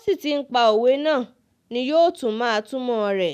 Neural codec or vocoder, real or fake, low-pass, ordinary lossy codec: none; real; 14.4 kHz; none